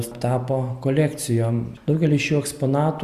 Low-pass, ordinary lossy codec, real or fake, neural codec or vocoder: 14.4 kHz; Opus, 64 kbps; real; none